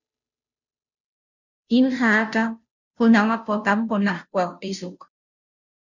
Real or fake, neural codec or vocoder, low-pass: fake; codec, 16 kHz, 0.5 kbps, FunCodec, trained on Chinese and English, 25 frames a second; 7.2 kHz